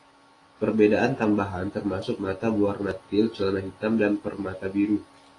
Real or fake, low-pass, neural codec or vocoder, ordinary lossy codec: real; 10.8 kHz; none; AAC, 32 kbps